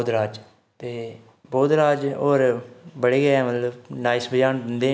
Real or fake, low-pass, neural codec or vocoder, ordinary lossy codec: real; none; none; none